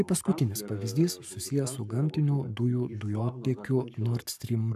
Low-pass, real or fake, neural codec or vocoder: 14.4 kHz; fake; codec, 44.1 kHz, 7.8 kbps, Pupu-Codec